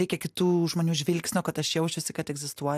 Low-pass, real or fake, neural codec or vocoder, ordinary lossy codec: 14.4 kHz; real; none; AAC, 96 kbps